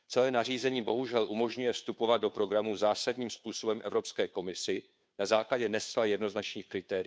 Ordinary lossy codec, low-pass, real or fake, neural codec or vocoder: none; none; fake; codec, 16 kHz, 2 kbps, FunCodec, trained on Chinese and English, 25 frames a second